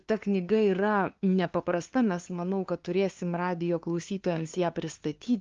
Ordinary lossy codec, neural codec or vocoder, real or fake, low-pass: Opus, 32 kbps; codec, 16 kHz, 2 kbps, FunCodec, trained on LibriTTS, 25 frames a second; fake; 7.2 kHz